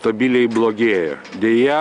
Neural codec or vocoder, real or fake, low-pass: none; real; 9.9 kHz